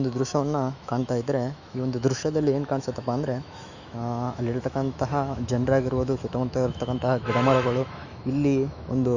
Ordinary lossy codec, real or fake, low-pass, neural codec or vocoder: none; fake; 7.2 kHz; autoencoder, 48 kHz, 128 numbers a frame, DAC-VAE, trained on Japanese speech